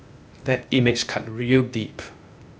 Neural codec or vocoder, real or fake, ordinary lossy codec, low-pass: codec, 16 kHz, 0.8 kbps, ZipCodec; fake; none; none